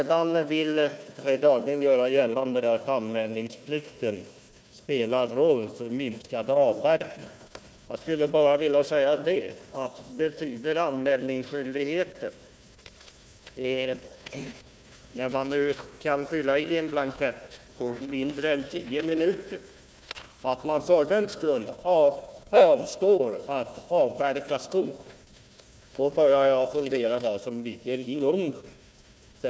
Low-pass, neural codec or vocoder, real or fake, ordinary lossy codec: none; codec, 16 kHz, 1 kbps, FunCodec, trained on Chinese and English, 50 frames a second; fake; none